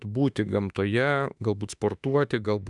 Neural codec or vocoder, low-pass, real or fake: autoencoder, 48 kHz, 32 numbers a frame, DAC-VAE, trained on Japanese speech; 10.8 kHz; fake